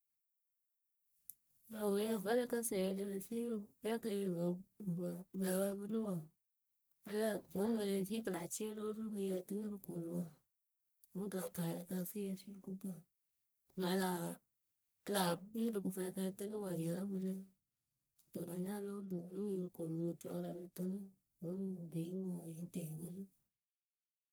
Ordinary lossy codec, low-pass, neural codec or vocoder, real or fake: none; none; codec, 44.1 kHz, 1.7 kbps, Pupu-Codec; fake